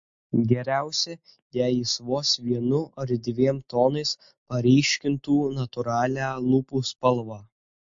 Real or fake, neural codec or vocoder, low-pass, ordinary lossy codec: real; none; 7.2 kHz; MP3, 48 kbps